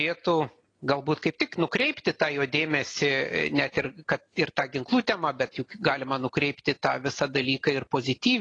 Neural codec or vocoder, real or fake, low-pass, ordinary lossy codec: none; real; 9.9 kHz; AAC, 32 kbps